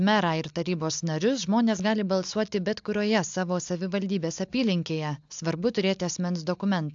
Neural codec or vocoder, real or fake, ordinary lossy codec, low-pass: none; real; AAC, 64 kbps; 7.2 kHz